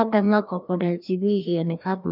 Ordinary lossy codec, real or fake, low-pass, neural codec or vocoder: none; fake; 5.4 kHz; codec, 16 kHz, 1 kbps, FreqCodec, larger model